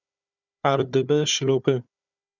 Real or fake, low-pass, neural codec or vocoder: fake; 7.2 kHz; codec, 16 kHz, 4 kbps, FunCodec, trained on Chinese and English, 50 frames a second